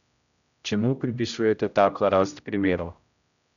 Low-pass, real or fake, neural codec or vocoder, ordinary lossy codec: 7.2 kHz; fake; codec, 16 kHz, 0.5 kbps, X-Codec, HuBERT features, trained on general audio; none